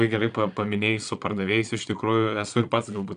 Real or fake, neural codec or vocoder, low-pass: fake; vocoder, 22.05 kHz, 80 mel bands, Vocos; 9.9 kHz